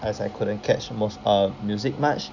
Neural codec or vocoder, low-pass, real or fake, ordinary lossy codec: none; 7.2 kHz; real; none